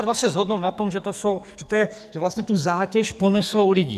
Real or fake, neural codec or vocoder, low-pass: fake; codec, 44.1 kHz, 2.6 kbps, SNAC; 14.4 kHz